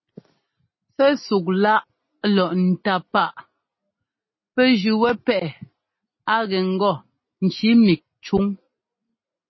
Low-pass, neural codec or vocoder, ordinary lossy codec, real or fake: 7.2 kHz; none; MP3, 24 kbps; real